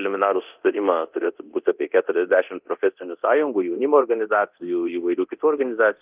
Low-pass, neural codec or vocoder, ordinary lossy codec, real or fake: 3.6 kHz; codec, 24 kHz, 0.9 kbps, DualCodec; Opus, 24 kbps; fake